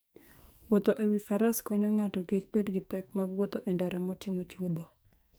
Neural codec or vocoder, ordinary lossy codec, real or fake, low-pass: codec, 44.1 kHz, 2.6 kbps, SNAC; none; fake; none